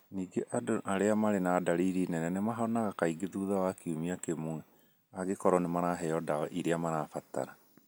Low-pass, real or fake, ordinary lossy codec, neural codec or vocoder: none; real; none; none